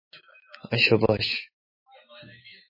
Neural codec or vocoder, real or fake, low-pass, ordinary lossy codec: autoencoder, 48 kHz, 128 numbers a frame, DAC-VAE, trained on Japanese speech; fake; 5.4 kHz; MP3, 24 kbps